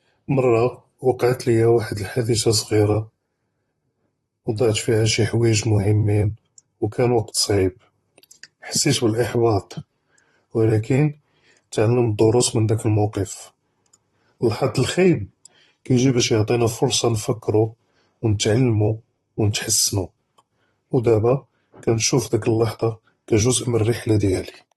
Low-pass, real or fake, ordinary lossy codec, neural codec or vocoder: 19.8 kHz; fake; AAC, 32 kbps; vocoder, 44.1 kHz, 128 mel bands, Pupu-Vocoder